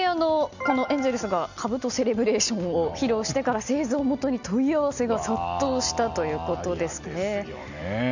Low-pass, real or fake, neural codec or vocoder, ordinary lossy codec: 7.2 kHz; real; none; none